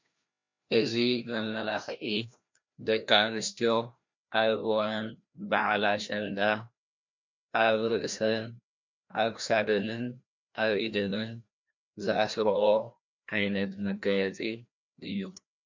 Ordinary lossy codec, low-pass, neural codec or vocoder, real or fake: MP3, 48 kbps; 7.2 kHz; codec, 16 kHz, 1 kbps, FreqCodec, larger model; fake